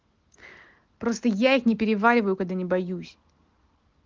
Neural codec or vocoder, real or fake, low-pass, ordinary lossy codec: none; real; 7.2 kHz; Opus, 16 kbps